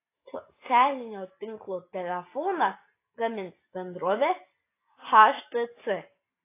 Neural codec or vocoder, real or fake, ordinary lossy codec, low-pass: none; real; AAC, 24 kbps; 3.6 kHz